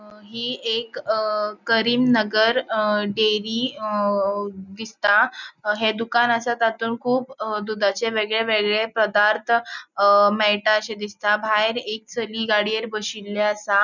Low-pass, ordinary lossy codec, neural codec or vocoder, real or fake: 7.2 kHz; none; none; real